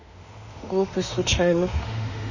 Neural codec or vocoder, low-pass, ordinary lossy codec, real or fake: autoencoder, 48 kHz, 32 numbers a frame, DAC-VAE, trained on Japanese speech; 7.2 kHz; none; fake